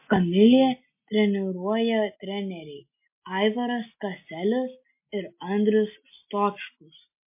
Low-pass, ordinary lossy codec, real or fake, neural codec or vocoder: 3.6 kHz; MP3, 24 kbps; real; none